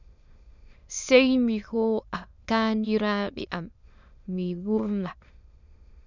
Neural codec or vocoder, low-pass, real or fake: autoencoder, 22.05 kHz, a latent of 192 numbers a frame, VITS, trained on many speakers; 7.2 kHz; fake